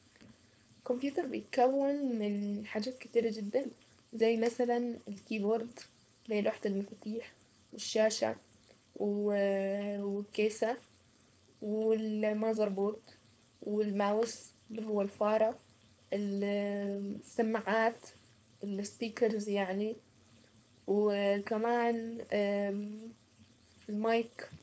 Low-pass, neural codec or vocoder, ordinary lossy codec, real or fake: none; codec, 16 kHz, 4.8 kbps, FACodec; none; fake